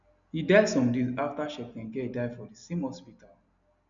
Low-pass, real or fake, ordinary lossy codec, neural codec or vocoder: 7.2 kHz; real; none; none